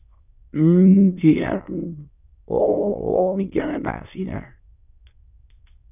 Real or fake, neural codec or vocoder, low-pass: fake; autoencoder, 22.05 kHz, a latent of 192 numbers a frame, VITS, trained on many speakers; 3.6 kHz